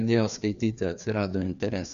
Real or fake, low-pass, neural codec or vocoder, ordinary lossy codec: fake; 7.2 kHz; codec, 16 kHz, 2 kbps, FreqCodec, larger model; MP3, 96 kbps